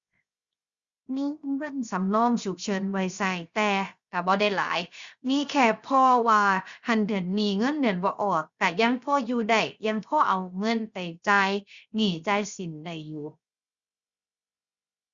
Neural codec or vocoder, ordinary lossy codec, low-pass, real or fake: codec, 16 kHz, 0.7 kbps, FocalCodec; Opus, 64 kbps; 7.2 kHz; fake